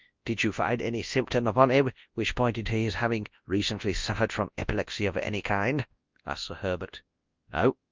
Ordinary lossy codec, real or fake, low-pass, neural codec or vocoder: Opus, 24 kbps; fake; 7.2 kHz; codec, 24 kHz, 0.9 kbps, WavTokenizer, large speech release